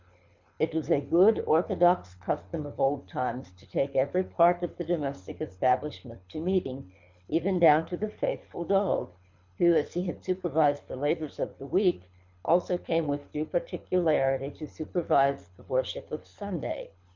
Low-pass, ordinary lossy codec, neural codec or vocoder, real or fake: 7.2 kHz; MP3, 64 kbps; codec, 24 kHz, 6 kbps, HILCodec; fake